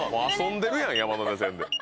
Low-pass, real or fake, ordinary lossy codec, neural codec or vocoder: none; real; none; none